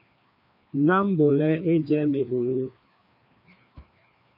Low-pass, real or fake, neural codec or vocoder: 5.4 kHz; fake; codec, 16 kHz, 2 kbps, FreqCodec, larger model